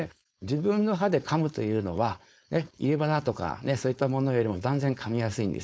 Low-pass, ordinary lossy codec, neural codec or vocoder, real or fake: none; none; codec, 16 kHz, 4.8 kbps, FACodec; fake